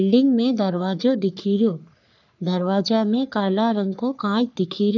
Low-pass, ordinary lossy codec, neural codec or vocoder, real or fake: 7.2 kHz; none; codec, 44.1 kHz, 3.4 kbps, Pupu-Codec; fake